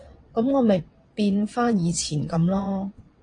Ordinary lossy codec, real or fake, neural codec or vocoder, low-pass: AAC, 48 kbps; fake; vocoder, 22.05 kHz, 80 mel bands, WaveNeXt; 9.9 kHz